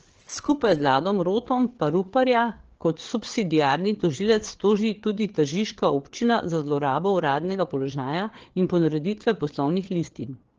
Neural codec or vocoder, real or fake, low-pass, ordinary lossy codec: codec, 16 kHz, 4 kbps, FreqCodec, larger model; fake; 7.2 kHz; Opus, 16 kbps